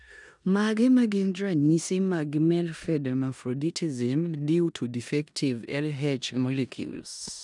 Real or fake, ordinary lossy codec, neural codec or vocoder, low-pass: fake; none; codec, 16 kHz in and 24 kHz out, 0.9 kbps, LongCat-Audio-Codec, four codebook decoder; 10.8 kHz